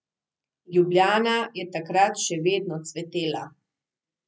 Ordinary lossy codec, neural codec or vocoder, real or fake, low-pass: none; none; real; none